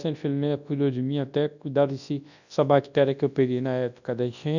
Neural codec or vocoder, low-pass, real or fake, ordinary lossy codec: codec, 24 kHz, 0.9 kbps, WavTokenizer, large speech release; 7.2 kHz; fake; none